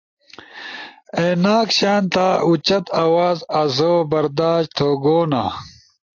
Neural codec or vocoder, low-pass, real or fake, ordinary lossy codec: none; 7.2 kHz; real; AAC, 48 kbps